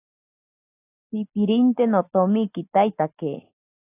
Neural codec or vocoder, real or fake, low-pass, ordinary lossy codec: none; real; 3.6 kHz; AAC, 24 kbps